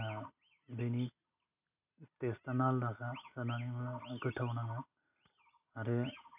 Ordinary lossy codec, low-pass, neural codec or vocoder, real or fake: none; 3.6 kHz; none; real